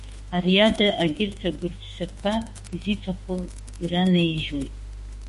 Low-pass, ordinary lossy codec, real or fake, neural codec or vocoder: 14.4 kHz; MP3, 48 kbps; fake; autoencoder, 48 kHz, 32 numbers a frame, DAC-VAE, trained on Japanese speech